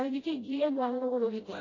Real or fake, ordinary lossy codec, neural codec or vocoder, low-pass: fake; AAC, 32 kbps; codec, 16 kHz, 0.5 kbps, FreqCodec, smaller model; 7.2 kHz